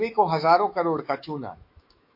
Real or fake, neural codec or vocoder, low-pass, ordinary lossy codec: fake; autoencoder, 48 kHz, 128 numbers a frame, DAC-VAE, trained on Japanese speech; 5.4 kHz; MP3, 32 kbps